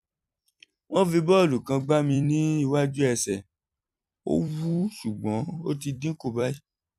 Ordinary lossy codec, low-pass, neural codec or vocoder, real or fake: none; 14.4 kHz; vocoder, 44.1 kHz, 128 mel bands every 256 samples, BigVGAN v2; fake